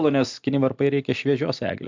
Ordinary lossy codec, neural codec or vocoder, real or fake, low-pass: MP3, 64 kbps; none; real; 7.2 kHz